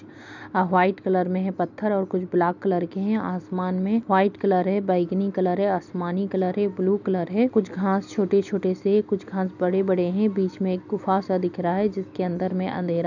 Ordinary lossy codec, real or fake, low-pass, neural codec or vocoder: none; real; 7.2 kHz; none